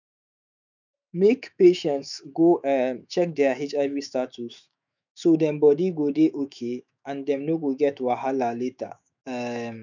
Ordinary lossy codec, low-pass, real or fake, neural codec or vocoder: none; 7.2 kHz; fake; autoencoder, 48 kHz, 128 numbers a frame, DAC-VAE, trained on Japanese speech